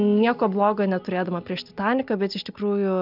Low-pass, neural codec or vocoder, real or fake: 5.4 kHz; none; real